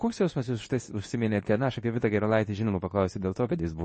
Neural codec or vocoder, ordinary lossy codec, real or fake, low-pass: codec, 24 kHz, 0.9 kbps, WavTokenizer, medium speech release version 2; MP3, 32 kbps; fake; 10.8 kHz